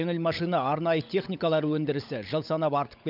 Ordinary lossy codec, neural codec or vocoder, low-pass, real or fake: none; codec, 16 kHz, 16 kbps, FunCodec, trained on LibriTTS, 50 frames a second; 5.4 kHz; fake